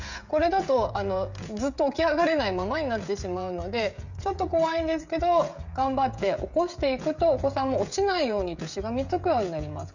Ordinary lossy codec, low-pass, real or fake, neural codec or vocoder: none; 7.2 kHz; fake; codec, 16 kHz, 16 kbps, FreqCodec, smaller model